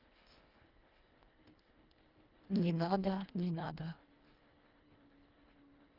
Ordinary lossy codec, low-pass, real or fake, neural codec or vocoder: Opus, 32 kbps; 5.4 kHz; fake; codec, 24 kHz, 1.5 kbps, HILCodec